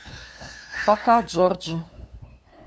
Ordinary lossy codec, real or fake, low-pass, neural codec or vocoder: none; fake; none; codec, 16 kHz, 2 kbps, FunCodec, trained on LibriTTS, 25 frames a second